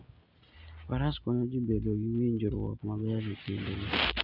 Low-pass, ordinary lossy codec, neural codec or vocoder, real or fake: 5.4 kHz; MP3, 48 kbps; none; real